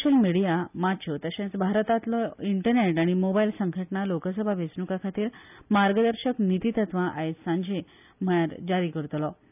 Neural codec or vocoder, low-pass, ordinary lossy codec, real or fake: none; 3.6 kHz; none; real